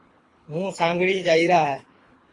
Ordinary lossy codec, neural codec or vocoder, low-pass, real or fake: AAC, 32 kbps; codec, 24 kHz, 3 kbps, HILCodec; 10.8 kHz; fake